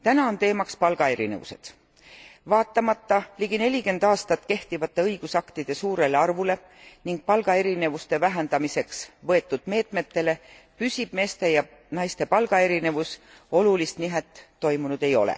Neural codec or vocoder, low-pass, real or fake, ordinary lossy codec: none; none; real; none